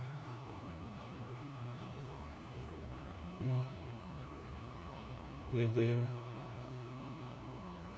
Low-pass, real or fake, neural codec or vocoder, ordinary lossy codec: none; fake; codec, 16 kHz, 1 kbps, FunCodec, trained on LibriTTS, 50 frames a second; none